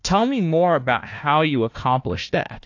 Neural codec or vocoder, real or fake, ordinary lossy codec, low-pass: codec, 16 kHz, 1 kbps, FunCodec, trained on Chinese and English, 50 frames a second; fake; AAC, 48 kbps; 7.2 kHz